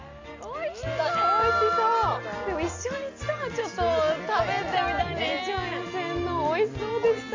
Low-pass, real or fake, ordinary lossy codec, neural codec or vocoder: 7.2 kHz; real; AAC, 48 kbps; none